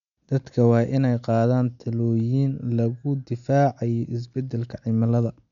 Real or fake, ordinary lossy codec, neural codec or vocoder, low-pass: real; none; none; 7.2 kHz